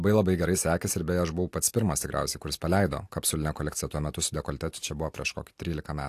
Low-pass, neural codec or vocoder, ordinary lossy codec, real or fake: 14.4 kHz; none; AAC, 64 kbps; real